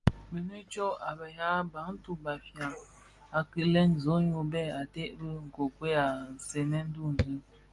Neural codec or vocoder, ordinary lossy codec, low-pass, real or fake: none; Opus, 32 kbps; 10.8 kHz; real